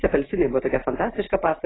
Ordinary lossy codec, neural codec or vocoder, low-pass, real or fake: AAC, 16 kbps; none; 7.2 kHz; real